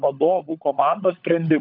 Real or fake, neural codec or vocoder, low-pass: fake; codec, 16 kHz, 16 kbps, FunCodec, trained on LibriTTS, 50 frames a second; 5.4 kHz